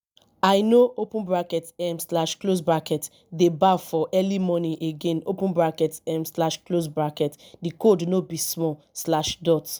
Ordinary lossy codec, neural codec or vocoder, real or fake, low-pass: none; none; real; none